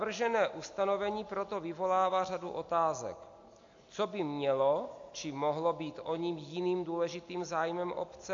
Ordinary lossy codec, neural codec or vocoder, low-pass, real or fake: AAC, 48 kbps; none; 7.2 kHz; real